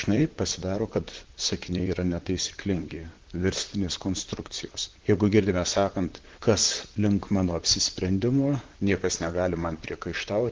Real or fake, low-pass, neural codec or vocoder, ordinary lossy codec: fake; 7.2 kHz; vocoder, 44.1 kHz, 128 mel bands, Pupu-Vocoder; Opus, 16 kbps